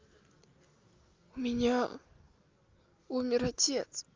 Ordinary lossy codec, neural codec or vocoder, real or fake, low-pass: Opus, 24 kbps; none; real; 7.2 kHz